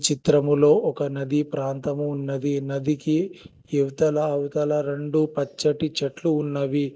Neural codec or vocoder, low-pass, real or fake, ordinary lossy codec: none; none; real; none